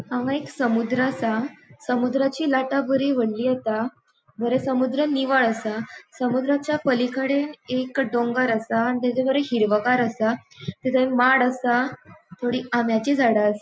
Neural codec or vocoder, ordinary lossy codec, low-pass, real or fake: none; none; none; real